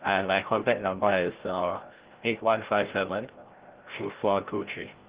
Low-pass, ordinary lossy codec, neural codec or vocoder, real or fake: 3.6 kHz; Opus, 16 kbps; codec, 16 kHz, 0.5 kbps, FreqCodec, larger model; fake